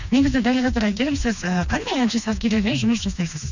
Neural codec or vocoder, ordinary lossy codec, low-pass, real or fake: codec, 16 kHz, 2 kbps, FreqCodec, smaller model; none; 7.2 kHz; fake